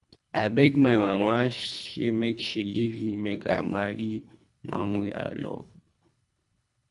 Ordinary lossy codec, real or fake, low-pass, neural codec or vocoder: none; fake; 10.8 kHz; codec, 24 kHz, 1.5 kbps, HILCodec